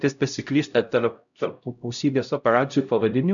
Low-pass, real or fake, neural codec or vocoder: 7.2 kHz; fake; codec, 16 kHz, 0.5 kbps, X-Codec, HuBERT features, trained on LibriSpeech